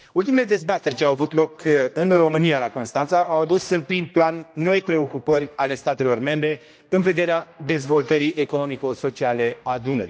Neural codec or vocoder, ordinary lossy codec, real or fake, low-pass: codec, 16 kHz, 1 kbps, X-Codec, HuBERT features, trained on general audio; none; fake; none